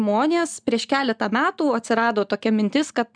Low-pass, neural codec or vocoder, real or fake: 9.9 kHz; none; real